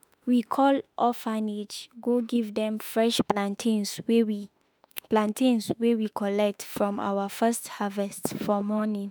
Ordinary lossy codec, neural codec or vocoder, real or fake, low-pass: none; autoencoder, 48 kHz, 32 numbers a frame, DAC-VAE, trained on Japanese speech; fake; none